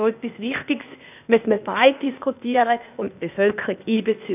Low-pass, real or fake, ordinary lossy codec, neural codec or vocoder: 3.6 kHz; fake; none; codec, 16 kHz, 0.8 kbps, ZipCodec